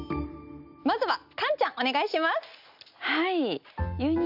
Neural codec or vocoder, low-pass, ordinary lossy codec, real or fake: none; 5.4 kHz; none; real